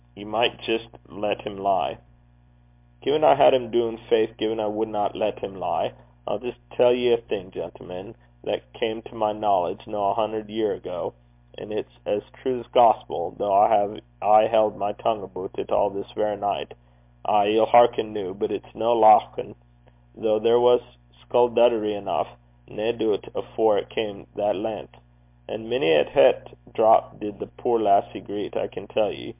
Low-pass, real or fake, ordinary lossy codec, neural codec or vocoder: 3.6 kHz; real; MP3, 32 kbps; none